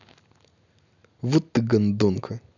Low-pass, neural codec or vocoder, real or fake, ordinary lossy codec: 7.2 kHz; none; real; none